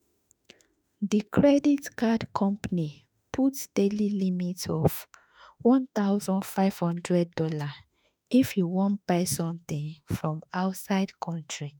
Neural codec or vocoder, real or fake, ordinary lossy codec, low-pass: autoencoder, 48 kHz, 32 numbers a frame, DAC-VAE, trained on Japanese speech; fake; none; none